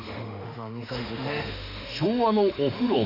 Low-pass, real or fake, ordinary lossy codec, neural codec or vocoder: 5.4 kHz; fake; none; autoencoder, 48 kHz, 32 numbers a frame, DAC-VAE, trained on Japanese speech